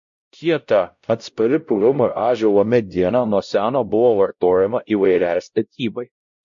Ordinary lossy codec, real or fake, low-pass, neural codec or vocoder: MP3, 48 kbps; fake; 7.2 kHz; codec, 16 kHz, 0.5 kbps, X-Codec, WavLM features, trained on Multilingual LibriSpeech